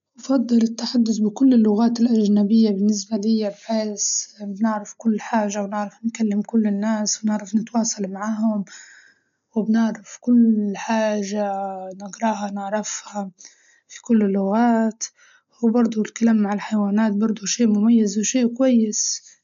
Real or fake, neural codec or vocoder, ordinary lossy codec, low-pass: real; none; MP3, 96 kbps; 7.2 kHz